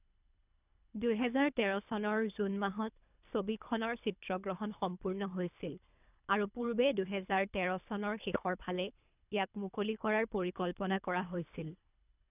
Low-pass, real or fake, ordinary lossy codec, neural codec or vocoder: 3.6 kHz; fake; none; codec, 24 kHz, 3 kbps, HILCodec